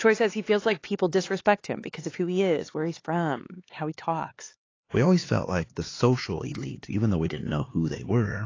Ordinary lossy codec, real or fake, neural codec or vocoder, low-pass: AAC, 32 kbps; fake; codec, 16 kHz, 4 kbps, X-Codec, HuBERT features, trained on LibriSpeech; 7.2 kHz